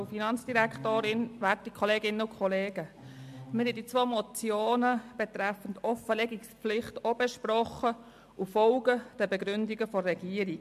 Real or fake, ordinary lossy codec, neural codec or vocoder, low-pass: real; MP3, 64 kbps; none; 14.4 kHz